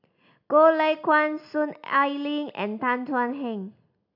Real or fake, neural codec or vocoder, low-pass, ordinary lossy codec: real; none; 5.4 kHz; MP3, 48 kbps